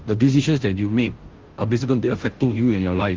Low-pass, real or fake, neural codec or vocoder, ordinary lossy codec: 7.2 kHz; fake; codec, 16 kHz, 0.5 kbps, FunCodec, trained on Chinese and English, 25 frames a second; Opus, 16 kbps